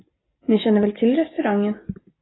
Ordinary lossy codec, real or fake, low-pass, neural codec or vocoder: AAC, 16 kbps; real; 7.2 kHz; none